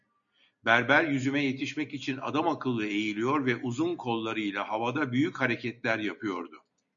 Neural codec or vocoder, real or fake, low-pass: none; real; 7.2 kHz